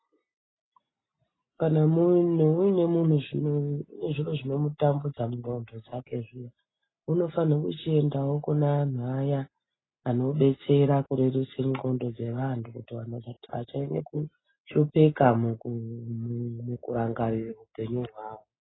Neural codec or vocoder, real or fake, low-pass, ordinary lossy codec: none; real; 7.2 kHz; AAC, 16 kbps